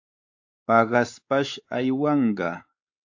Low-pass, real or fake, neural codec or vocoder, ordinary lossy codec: 7.2 kHz; fake; codec, 16 kHz, 4 kbps, X-Codec, WavLM features, trained on Multilingual LibriSpeech; AAC, 48 kbps